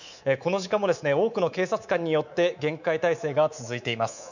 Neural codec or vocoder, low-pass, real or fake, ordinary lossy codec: codec, 24 kHz, 3.1 kbps, DualCodec; 7.2 kHz; fake; none